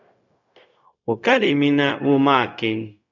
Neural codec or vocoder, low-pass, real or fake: codec, 16 kHz, 0.4 kbps, LongCat-Audio-Codec; 7.2 kHz; fake